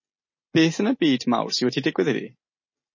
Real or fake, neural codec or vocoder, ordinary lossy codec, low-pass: real; none; MP3, 32 kbps; 7.2 kHz